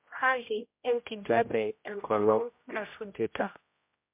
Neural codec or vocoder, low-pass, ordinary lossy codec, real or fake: codec, 16 kHz, 0.5 kbps, X-Codec, HuBERT features, trained on general audio; 3.6 kHz; MP3, 32 kbps; fake